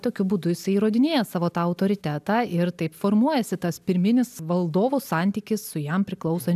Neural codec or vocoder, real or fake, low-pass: none; real; 14.4 kHz